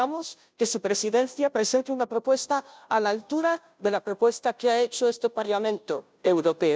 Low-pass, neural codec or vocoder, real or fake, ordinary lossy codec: none; codec, 16 kHz, 0.5 kbps, FunCodec, trained on Chinese and English, 25 frames a second; fake; none